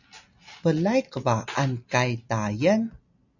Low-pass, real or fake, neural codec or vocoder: 7.2 kHz; real; none